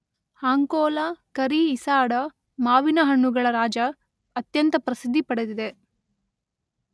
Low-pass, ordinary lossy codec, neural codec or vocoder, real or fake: none; none; none; real